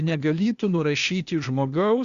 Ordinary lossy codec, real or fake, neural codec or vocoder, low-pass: Opus, 64 kbps; fake; codec, 16 kHz, 0.8 kbps, ZipCodec; 7.2 kHz